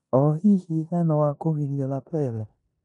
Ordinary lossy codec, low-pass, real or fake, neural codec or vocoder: none; 10.8 kHz; fake; codec, 16 kHz in and 24 kHz out, 0.9 kbps, LongCat-Audio-Codec, four codebook decoder